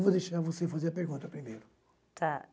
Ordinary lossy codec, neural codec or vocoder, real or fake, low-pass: none; none; real; none